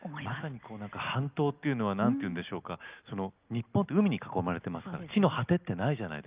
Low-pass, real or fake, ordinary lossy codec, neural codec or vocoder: 3.6 kHz; real; Opus, 24 kbps; none